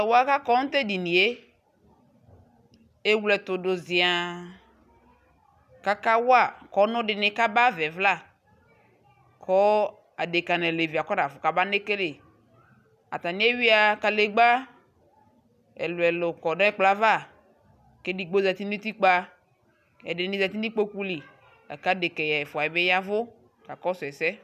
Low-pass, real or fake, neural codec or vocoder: 14.4 kHz; real; none